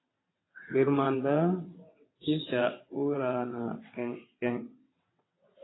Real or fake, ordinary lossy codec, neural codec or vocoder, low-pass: fake; AAC, 16 kbps; vocoder, 22.05 kHz, 80 mel bands, WaveNeXt; 7.2 kHz